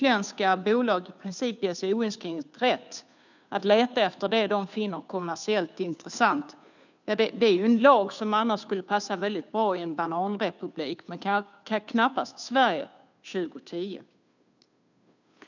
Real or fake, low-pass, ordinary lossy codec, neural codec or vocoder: fake; 7.2 kHz; none; codec, 16 kHz, 2 kbps, FunCodec, trained on Chinese and English, 25 frames a second